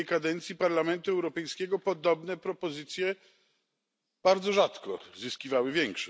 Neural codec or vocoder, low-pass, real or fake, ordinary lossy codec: none; none; real; none